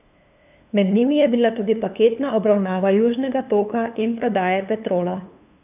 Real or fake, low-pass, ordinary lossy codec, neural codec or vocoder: fake; 3.6 kHz; none; codec, 16 kHz, 2 kbps, FunCodec, trained on LibriTTS, 25 frames a second